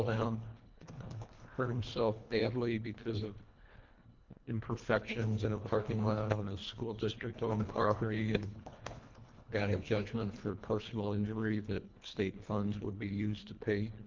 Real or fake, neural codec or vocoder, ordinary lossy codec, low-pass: fake; codec, 24 kHz, 1.5 kbps, HILCodec; Opus, 32 kbps; 7.2 kHz